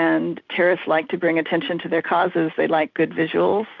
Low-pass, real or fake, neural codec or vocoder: 7.2 kHz; real; none